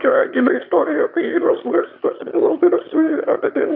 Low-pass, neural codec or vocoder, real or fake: 5.4 kHz; autoencoder, 22.05 kHz, a latent of 192 numbers a frame, VITS, trained on one speaker; fake